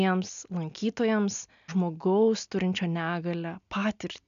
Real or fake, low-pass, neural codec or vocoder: real; 7.2 kHz; none